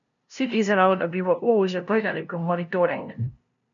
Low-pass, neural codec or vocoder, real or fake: 7.2 kHz; codec, 16 kHz, 0.5 kbps, FunCodec, trained on LibriTTS, 25 frames a second; fake